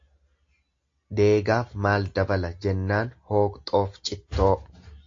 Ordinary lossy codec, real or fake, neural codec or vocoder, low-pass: AAC, 48 kbps; real; none; 7.2 kHz